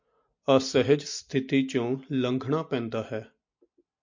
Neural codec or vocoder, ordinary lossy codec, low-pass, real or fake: none; MP3, 48 kbps; 7.2 kHz; real